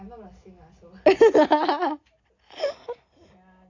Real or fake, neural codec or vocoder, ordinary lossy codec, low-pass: real; none; AAC, 48 kbps; 7.2 kHz